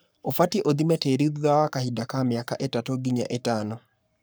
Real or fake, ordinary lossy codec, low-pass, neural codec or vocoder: fake; none; none; codec, 44.1 kHz, 7.8 kbps, Pupu-Codec